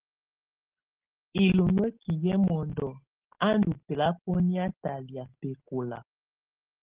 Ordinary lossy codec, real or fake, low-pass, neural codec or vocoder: Opus, 16 kbps; real; 3.6 kHz; none